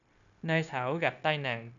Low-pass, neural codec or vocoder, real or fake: 7.2 kHz; codec, 16 kHz, 0.9 kbps, LongCat-Audio-Codec; fake